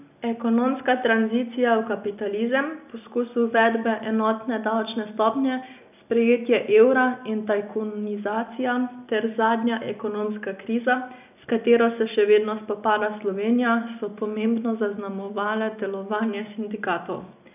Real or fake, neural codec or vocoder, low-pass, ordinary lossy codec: real; none; 3.6 kHz; none